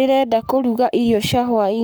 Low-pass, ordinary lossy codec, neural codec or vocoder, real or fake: none; none; codec, 44.1 kHz, 7.8 kbps, Pupu-Codec; fake